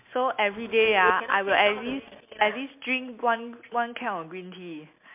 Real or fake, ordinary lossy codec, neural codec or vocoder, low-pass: real; MP3, 32 kbps; none; 3.6 kHz